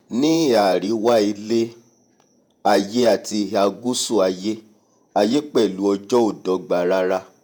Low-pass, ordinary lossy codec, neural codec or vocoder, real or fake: none; none; vocoder, 48 kHz, 128 mel bands, Vocos; fake